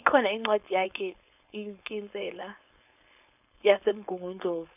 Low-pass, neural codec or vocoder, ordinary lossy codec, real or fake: 3.6 kHz; codec, 16 kHz, 4.8 kbps, FACodec; none; fake